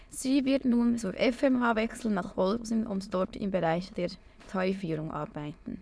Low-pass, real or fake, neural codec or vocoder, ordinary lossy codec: none; fake; autoencoder, 22.05 kHz, a latent of 192 numbers a frame, VITS, trained on many speakers; none